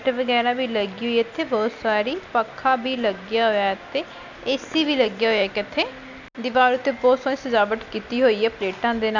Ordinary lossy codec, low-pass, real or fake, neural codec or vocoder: none; 7.2 kHz; real; none